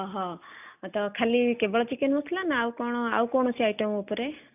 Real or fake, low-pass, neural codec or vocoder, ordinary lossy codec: real; 3.6 kHz; none; none